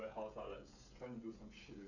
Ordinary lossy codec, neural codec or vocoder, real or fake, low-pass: none; codec, 16 kHz, 16 kbps, FreqCodec, smaller model; fake; 7.2 kHz